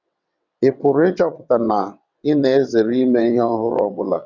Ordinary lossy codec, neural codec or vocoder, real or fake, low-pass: none; vocoder, 22.05 kHz, 80 mel bands, WaveNeXt; fake; 7.2 kHz